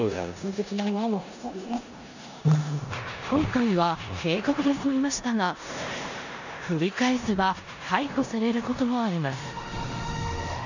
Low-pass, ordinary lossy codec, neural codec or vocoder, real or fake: 7.2 kHz; none; codec, 16 kHz in and 24 kHz out, 0.9 kbps, LongCat-Audio-Codec, four codebook decoder; fake